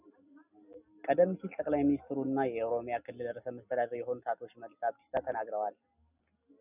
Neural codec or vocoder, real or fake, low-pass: none; real; 3.6 kHz